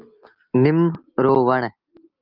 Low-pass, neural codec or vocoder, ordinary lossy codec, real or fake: 5.4 kHz; none; Opus, 24 kbps; real